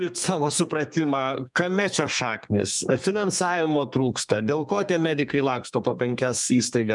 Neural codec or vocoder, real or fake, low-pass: codec, 32 kHz, 1.9 kbps, SNAC; fake; 10.8 kHz